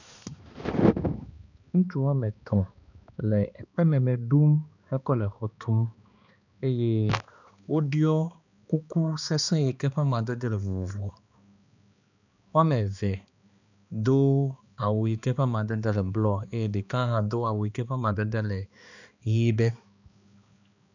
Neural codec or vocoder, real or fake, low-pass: codec, 16 kHz, 2 kbps, X-Codec, HuBERT features, trained on balanced general audio; fake; 7.2 kHz